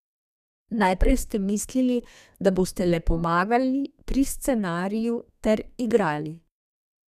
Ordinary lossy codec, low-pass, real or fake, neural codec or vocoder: none; 14.4 kHz; fake; codec, 32 kHz, 1.9 kbps, SNAC